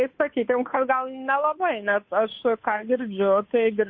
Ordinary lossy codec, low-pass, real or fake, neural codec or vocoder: MP3, 32 kbps; 7.2 kHz; fake; codec, 16 kHz, 2 kbps, FunCodec, trained on Chinese and English, 25 frames a second